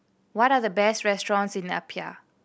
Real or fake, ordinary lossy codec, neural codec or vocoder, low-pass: real; none; none; none